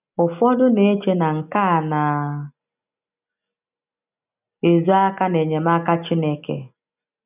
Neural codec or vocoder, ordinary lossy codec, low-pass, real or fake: none; none; 3.6 kHz; real